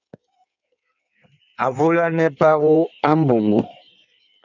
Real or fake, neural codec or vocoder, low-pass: fake; codec, 16 kHz in and 24 kHz out, 1.1 kbps, FireRedTTS-2 codec; 7.2 kHz